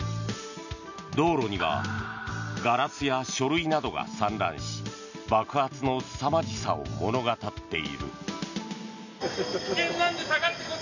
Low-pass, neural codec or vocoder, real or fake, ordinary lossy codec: 7.2 kHz; none; real; none